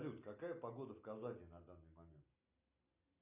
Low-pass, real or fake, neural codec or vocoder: 3.6 kHz; real; none